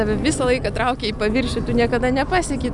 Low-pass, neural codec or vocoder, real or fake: 10.8 kHz; none; real